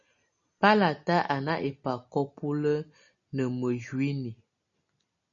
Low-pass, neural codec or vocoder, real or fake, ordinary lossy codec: 7.2 kHz; none; real; MP3, 96 kbps